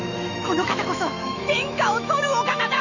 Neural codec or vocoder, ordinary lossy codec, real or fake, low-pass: autoencoder, 48 kHz, 128 numbers a frame, DAC-VAE, trained on Japanese speech; none; fake; 7.2 kHz